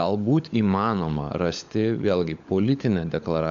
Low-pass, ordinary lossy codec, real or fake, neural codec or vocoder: 7.2 kHz; MP3, 96 kbps; fake; codec, 16 kHz, 16 kbps, FunCodec, trained on LibriTTS, 50 frames a second